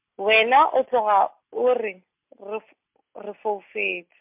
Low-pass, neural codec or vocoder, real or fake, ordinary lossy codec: 3.6 kHz; none; real; MP3, 32 kbps